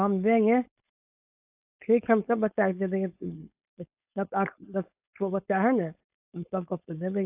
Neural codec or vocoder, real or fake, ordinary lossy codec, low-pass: codec, 16 kHz, 4.8 kbps, FACodec; fake; AAC, 32 kbps; 3.6 kHz